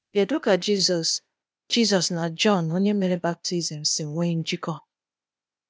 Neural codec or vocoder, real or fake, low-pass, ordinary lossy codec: codec, 16 kHz, 0.8 kbps, ZipCodec; fake; none; none